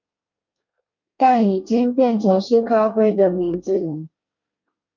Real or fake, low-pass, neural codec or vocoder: fake; 7.2 kHz; codec, 24 kHz, 1 kbps, SNAC